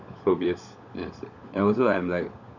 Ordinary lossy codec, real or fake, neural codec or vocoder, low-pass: none; fake; codec, 16 kHz, 16 kbps, FunCodec, trained on LibriTTS, 50 frames a second; 7.2 kHz